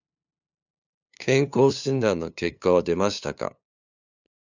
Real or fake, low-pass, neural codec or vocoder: fake; 7.2 kHz; codec, 16 kHz, 2 kbps, FunCodec, trained on LibriTTS, 25 frames a second